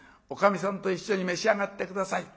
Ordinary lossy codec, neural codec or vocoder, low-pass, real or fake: none; none; none; real